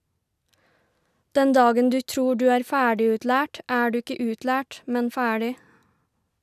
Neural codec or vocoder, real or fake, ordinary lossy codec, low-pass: none; real; none; 14.4 kHz